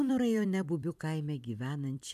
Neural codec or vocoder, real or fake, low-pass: vocoder, 44.1 kHz, 128 mel bands every 256 samples, BigVGAN v2; fake; 14.4 kHz